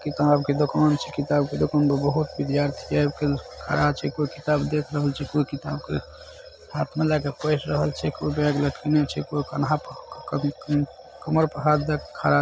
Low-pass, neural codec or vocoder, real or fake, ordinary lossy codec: none; none; real; none